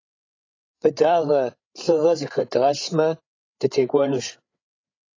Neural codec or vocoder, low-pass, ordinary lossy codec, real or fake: codec, 16 kHz, 8 kbps, FreqCodec, larger model; 7.2 kHz; AAC, 32 kbps; fake